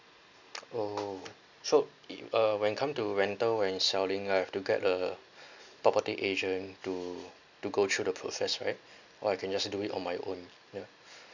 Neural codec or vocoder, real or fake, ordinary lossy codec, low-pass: none; real; none; 7.2 kHz